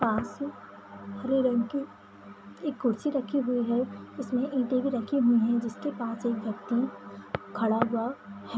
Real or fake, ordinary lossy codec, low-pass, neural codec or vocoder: real; none; none; none